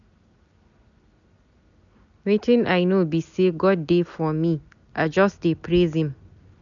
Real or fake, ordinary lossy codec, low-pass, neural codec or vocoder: real; none; 7.2 kHz; none